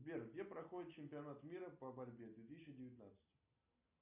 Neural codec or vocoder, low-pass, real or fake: none; 3.6 kHz; real